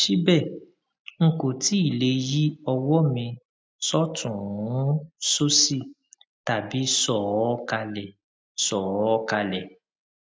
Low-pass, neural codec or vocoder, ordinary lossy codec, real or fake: none; none; none; real